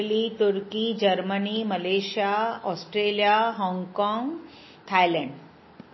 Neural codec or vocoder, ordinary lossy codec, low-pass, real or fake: none; MP3, 24 kbps; 7.2 kHz; real